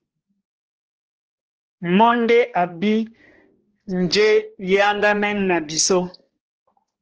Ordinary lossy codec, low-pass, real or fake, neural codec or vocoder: Opus, 16 kbps; 7.2 kHz; fake; codec, 16 kHz, 1 kbps, X-Codec, HuBERT features, trained on balanced general audio